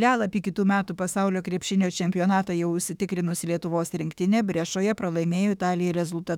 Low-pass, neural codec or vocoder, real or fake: 19.8 kHz; autoencoder, 48 kHz, 32 numbers a frame, DAC-VAE, trained on Japanese speech; fake